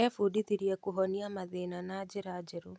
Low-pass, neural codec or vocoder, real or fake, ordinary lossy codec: none; none; real; none